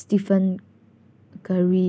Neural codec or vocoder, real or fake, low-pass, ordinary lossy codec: none; real; none; none